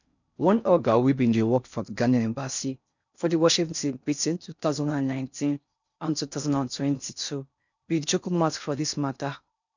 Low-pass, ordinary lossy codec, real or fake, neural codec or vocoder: 7.2 kHz; none; fake; codec, 16 kHz in and 24 kHz out, 0.6 kbps, FocalCodec, streaming, 4096 codes